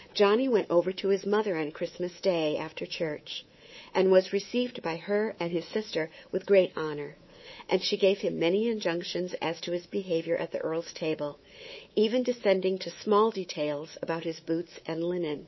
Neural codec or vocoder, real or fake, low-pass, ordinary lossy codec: codec, 24 kHz, 3.1 kbps, DualCodec; fake; 7.2 kHz; MP3, 24 kbps